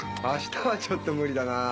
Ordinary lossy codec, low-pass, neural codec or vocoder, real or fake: none; none; none; real